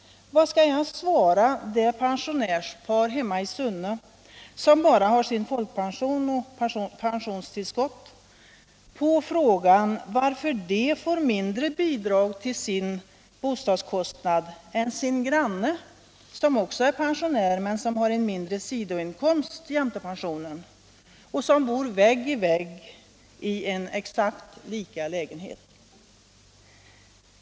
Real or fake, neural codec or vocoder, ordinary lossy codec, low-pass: real; none; none; none